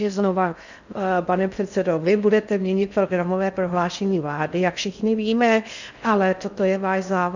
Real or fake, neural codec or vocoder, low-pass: fake; codec, 16 kHz in and 24 kHz out, 0.6 kbps, FocalCodec, streaming, 4096 codes; 7.2 kHz